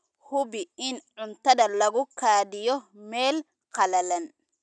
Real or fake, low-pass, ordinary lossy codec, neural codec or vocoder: real; 9.9 kHz; none; none